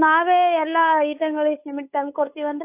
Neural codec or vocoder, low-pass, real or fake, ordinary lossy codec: codec, 16 kHz, 8 kbps, FunCodec, trained on Chinese and English, 25 frames a second; 3.6 kHz; fake; AAC, 24 kbps